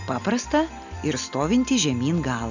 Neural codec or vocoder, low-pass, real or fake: none; 7.2 kHz; real